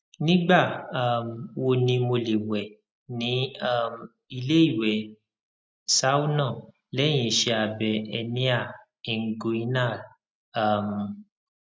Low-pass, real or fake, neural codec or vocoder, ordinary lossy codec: none; real; none; none